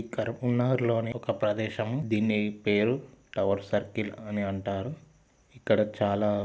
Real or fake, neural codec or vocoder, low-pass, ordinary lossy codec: real; none; none; none